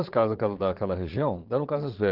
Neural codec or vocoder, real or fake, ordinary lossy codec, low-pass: vocoder, 22.05 kHz, 80 mel bands, Vocos; fake; Opus, 16 kbps; 5.4 kHz